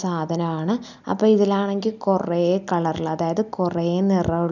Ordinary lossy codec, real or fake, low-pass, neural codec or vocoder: none; real; 7.2 kHz; none